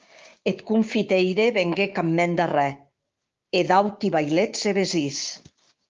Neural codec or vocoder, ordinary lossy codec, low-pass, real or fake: codec, 16 kHz, 6 kbps, DAC; Opus, 32 kbps; 7.2 kHz; fake